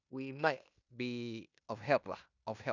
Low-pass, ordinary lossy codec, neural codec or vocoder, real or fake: 7.2 kHz; none; codec, 16 kHz in and 24 kHz out, 0.9 kbps, LongCat-Audio-Codec, fine tuned four codebook decoder; fake